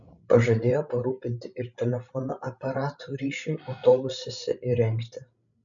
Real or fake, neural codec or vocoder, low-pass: fake; codec, 16 kHz, 16 kbps, FreqCodec, larger model; 7.2 kHz